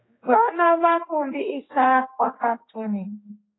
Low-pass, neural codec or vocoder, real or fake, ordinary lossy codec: 7.2 kHz; codec, 16 kHz, 2 kbps, X-Codec, HuBERT features, trained on general audio; fake; AAC, 16 kbps